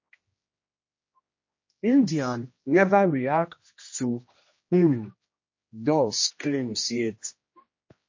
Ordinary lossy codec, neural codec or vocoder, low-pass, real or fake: MP3, 32 kbps; codec, 16 kHz, 1 kbps, X-Codec, HuBERT features, trained on general audio; 7.2 kHz; fake